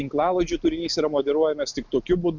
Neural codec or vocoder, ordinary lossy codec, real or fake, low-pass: none; MP3, 64 kbps; real; 7.2 kHz